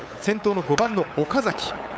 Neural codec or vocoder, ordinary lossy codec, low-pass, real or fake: codec, 16 kHz, 8 kbps, FunCodec, trained on LibriTTS, 25 frames a second; none; none; fake